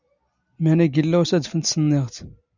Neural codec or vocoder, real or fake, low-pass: none; real; 7.2 kHz